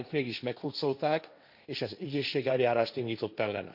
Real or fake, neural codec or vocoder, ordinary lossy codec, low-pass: fake; codec, 16 kHz, 1.1 kbps, Voila-Tokenizer; none; 5.4 kHz